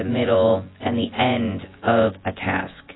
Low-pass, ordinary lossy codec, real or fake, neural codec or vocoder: 7.2 kHz; AAC, 16 kbps; fake; vocoder, 24 kHz, 100 mel bands, Vocos